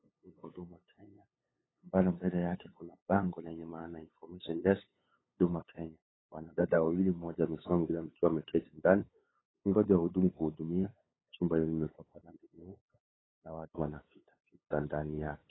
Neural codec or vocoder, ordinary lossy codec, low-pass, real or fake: codec, 16 kHz, 8 kbps, FunCodec, trained on LibriTTS, 25 frames a second; AAC, 16 kbps; 7.2 kHz; fake